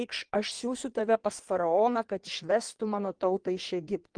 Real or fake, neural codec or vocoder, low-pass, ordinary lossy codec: fake; codec, 16 kHz in and 24 kHz out, 1.1 kbps, FireRedTTS-2 codec; 9.9 kHz; Opus, 24 kbps